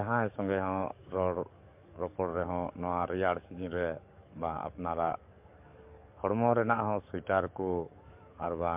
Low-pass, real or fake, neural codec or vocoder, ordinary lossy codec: 3.6 kHz; fake; codec, 44.1 kHz, 7.8 kbps, DAC; MP3, 32 kbps